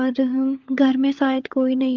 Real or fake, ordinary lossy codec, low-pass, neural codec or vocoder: fake; Opus, 32 kbps; 7.2 kHz; codec, 16 kHz, 16 kbps, FunCodec, trained on LibriTTS, 50 frames a second